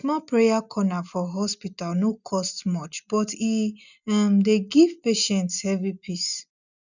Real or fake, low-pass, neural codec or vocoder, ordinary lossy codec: real; 7.2 kHz; none; none